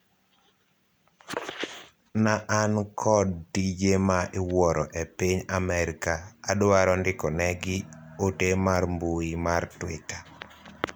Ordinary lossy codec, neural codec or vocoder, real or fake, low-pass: none; none; real; none